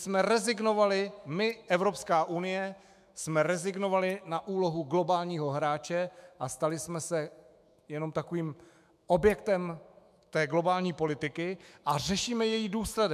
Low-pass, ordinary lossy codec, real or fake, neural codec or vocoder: 14.4 kHz; MP3, 96 kbps; fake; autoencoder, 48 kHz, 128 numbers a frame, DAC-VAE, trained on Japanese speech